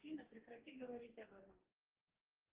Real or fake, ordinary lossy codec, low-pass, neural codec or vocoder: fake; Opus, 16 kbps; 3.6 kHz; codec, 44.1 kHz, 2.6 kbps, DAC